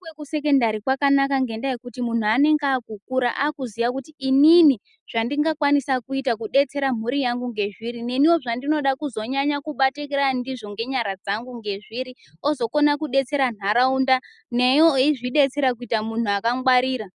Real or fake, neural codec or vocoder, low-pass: real; none; 10.8 kHz